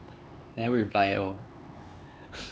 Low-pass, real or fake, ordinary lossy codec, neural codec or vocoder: none; fake; none; codec, 16 kHz, 4 kbps, X-Codec, HuBERT features, trained on LibriSpeech